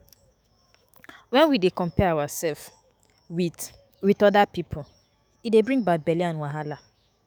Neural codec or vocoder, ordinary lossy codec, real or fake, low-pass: autoencoder, 48 kHz, 128 numbers a frame, DAC-VAE, trained on Japanese speech; none; fake; none